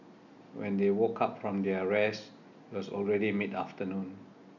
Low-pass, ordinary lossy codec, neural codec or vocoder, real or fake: 7.2 kHz; none; none; real